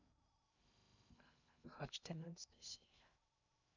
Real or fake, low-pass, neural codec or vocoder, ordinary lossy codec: fake; 7.2 kHz; codec, 16 kHz in and 24 kHz out, 0.6 kbps, FocalCodec, streaming, 2048 codes; none